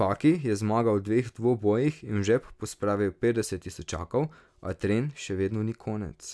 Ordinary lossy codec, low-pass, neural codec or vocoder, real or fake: none; none; none; real